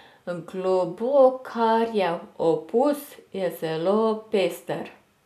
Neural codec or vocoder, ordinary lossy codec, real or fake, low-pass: none; none; real; 14.4 kHz